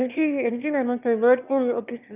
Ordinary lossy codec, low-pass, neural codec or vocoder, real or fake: none; 3.6 kHz; autoencoder, 22.05 kHz, a latent of 192 numbers a frame, VITS, trained on one speaker; fake